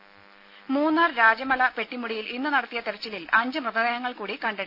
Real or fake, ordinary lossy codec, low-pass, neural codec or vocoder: real; none; 5.4 kHz; none